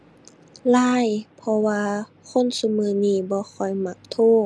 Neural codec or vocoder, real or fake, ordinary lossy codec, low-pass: none; real; none; none